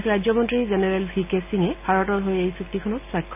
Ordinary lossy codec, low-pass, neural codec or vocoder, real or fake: none; 3.6 kHz; none; real